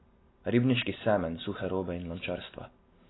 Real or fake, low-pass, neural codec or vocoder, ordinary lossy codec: real; 7.2 kHz; none; AAC, 16 kbps